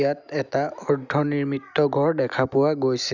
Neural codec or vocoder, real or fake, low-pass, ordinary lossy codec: none; real; 7.2 kHz; none